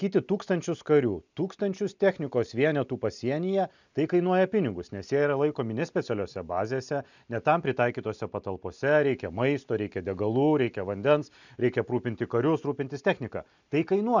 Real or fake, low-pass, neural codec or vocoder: real; 7.2 kHz; none